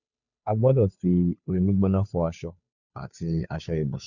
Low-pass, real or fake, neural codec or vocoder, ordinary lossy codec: 7.2 kHz; fake; codec, 16 kHz, 2 kbps, FunCodec, trained on Chinese and English, 25 frames a second; none